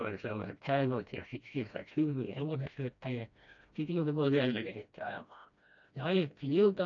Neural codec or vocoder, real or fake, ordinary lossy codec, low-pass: codec, 16 kHz, 1 kbps, FreqCodec, smaller model; fake; AAC, 64 kbps; 7.2 kHz